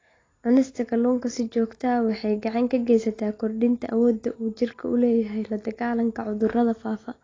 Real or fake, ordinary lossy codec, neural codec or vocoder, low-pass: real; AAC, 32 kbps; none; 7.2 kHz